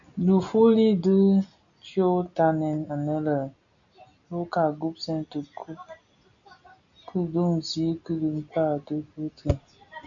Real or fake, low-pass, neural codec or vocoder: real; 7.2 kHz; none